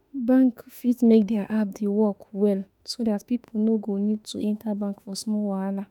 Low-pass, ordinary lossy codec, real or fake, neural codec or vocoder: 19.8 kHz; none; fake; autoencoder, 48 kHz, 32 numbers a frame, DAC-VAE, trained on Japanese speech